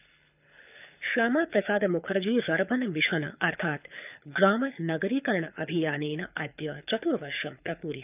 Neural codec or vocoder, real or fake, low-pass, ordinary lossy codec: codec, 24 kHz, 6 kbps, HILCodec; fake; 3.6 kHz; none